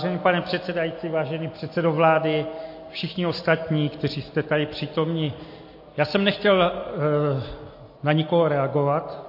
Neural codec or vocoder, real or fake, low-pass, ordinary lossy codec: none; real; 5.4 kHz; MP3, 32 kbps